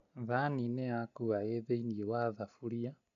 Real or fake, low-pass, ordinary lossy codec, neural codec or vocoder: real; 7.2 kHz; none; none